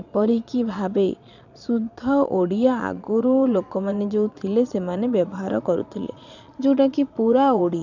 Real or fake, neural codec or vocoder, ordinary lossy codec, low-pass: fake; vocoder, 22.05 kHz, 80 mel bands, Vocos; none; 7.2 kHz